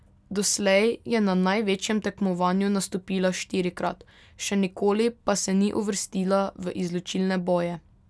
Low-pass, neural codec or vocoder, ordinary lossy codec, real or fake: none; none; none; real